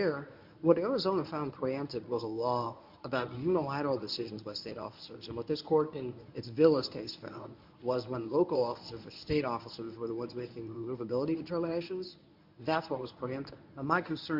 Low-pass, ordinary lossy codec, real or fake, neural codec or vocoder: 5.4 kHz; MP3, 48 kbps; fake; codec, 24 kHz, 0.9 kbps, WavTokenizer, medium speech release version 1